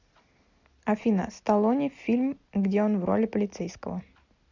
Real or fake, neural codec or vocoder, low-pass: real; none; 7.2 kHz